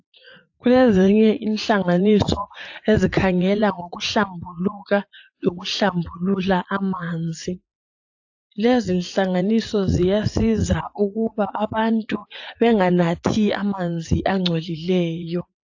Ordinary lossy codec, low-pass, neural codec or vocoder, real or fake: AAC, 48 kbps; 7.2 kHz; vocoder, 44.1 kHz, 80 mel bands, Vocos; fake